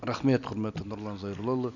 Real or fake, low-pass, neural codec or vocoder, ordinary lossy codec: real; 7.2 kHz; none; none